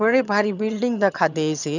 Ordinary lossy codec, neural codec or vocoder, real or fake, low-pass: none; vocoder, 22.05 kHz, 80 mel bands, HiFi-GAN; fake; 7.2 kHz